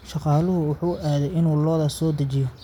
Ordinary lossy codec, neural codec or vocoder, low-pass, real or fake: none; none; 19.8 kHz; real